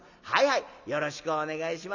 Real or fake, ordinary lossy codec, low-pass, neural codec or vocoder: real; none; 7.2 kHz; none